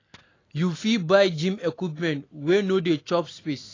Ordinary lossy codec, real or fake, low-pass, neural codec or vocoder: AAC, 32 kbps; real; 7.2 kHz; none